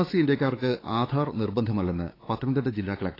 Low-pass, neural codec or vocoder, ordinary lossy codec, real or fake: 5.4 kHz; codec, 16 kHz, 4 kbps, X-Codec, WavLM features, trained on Multilingual LibriSpeech; AAC, 24 kbps; fake